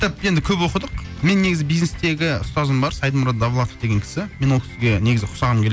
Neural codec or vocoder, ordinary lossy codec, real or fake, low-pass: none; none; real; none